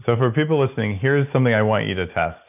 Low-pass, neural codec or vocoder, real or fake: 3.6 kHz; none; real